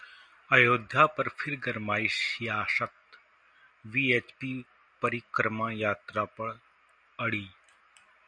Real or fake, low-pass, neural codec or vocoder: real; 9.9 kHz; none